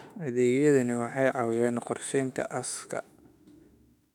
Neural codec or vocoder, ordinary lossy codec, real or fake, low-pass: autoencoder, 48 kHz, 32 numbers a frame, DAC-VAE, trained on Japanese speech; none; fake; 19.8 kHz